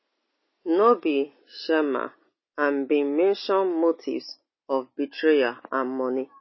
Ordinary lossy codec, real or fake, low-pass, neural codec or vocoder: MP3, 24 kbps; real; 7.2 kHz; none